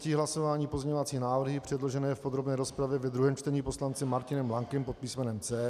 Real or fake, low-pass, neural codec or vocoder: real; 14.4 kHz; none